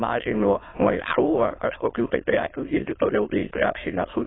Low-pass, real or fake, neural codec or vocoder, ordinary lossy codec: 7.2 kHz; fake; autoencoder, 22.05 kHz, a latent of 192 numbers a frame, VITS, trained on many speakers; AAC, 16 kbps